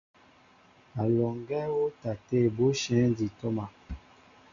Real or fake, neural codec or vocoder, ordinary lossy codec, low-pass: real; none; Opus, 64 kbps; 7.2 kHz